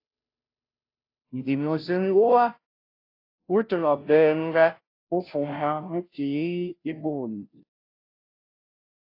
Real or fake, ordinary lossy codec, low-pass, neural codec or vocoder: fake; AAC, 32 kbps; 5.4 kHz; codec, 16 kHz, 0.5 kbps, FunCodec, trained on Chinese and English, 25 frames a second